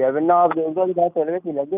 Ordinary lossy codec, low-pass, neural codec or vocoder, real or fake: none; 3.6 kHz; none; real